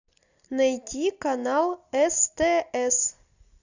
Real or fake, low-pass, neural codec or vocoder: real; 7.2 kHz; none